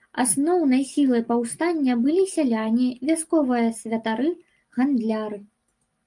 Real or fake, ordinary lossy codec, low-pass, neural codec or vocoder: real; Opus, 32 kbps; 10.8 kHz; none